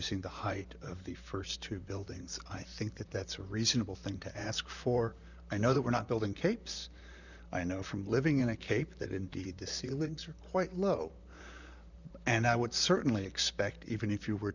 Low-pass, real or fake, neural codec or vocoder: 7.2 kHz; fake; vocoder, 44.1 kHz, 128 mel bands, Pupu-Vocoder